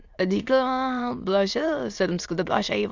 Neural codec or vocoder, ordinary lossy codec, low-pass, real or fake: autoencoder, 22.05 kHz, a latent of 192 numbers a frame, VITS, trained on many speakers; Opus, 64 kbps; 7.2 kHz; fake